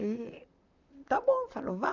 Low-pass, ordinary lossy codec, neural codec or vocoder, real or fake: 7.2 kHz; none; vocoder, 44.1 kHz, 128 mel bands, Pupu-Vocoder; fake